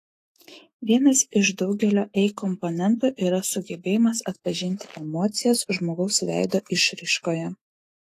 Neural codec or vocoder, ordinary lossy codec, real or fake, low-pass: autoencoder, 48 kHz, 128 numbers a frame, DAC-VAE, trained on Japanese speech; AAC, 48 kbps; fake; 14.4 kHz